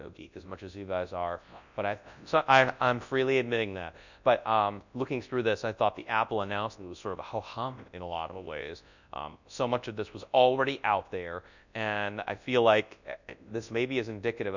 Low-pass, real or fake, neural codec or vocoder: 7.2 kHz; fake; codec, 24 kHz, 0.9 kbps, WavTokenizer, large speech release